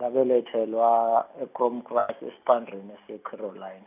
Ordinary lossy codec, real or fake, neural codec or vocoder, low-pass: none; real; none; 3.6 kHz